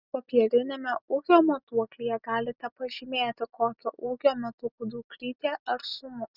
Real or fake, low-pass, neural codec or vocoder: real; 5.4 kHz; none